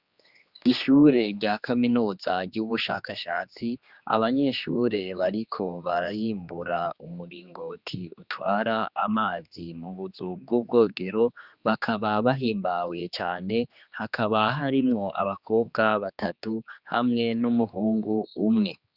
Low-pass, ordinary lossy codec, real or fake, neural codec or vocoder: 5.4 kHz; Opus, 64 kbps; fake; codec, 16 kHz, 2 kbps, X-Codec, HuBERT features, trained on general audio